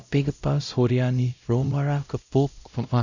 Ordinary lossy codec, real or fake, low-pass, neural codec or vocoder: none; fake; 7.2 kHz; codec, 16 kHz, 0.5 kbps, X-Codec, WavLM features, trained on Multilingual LibriSpeech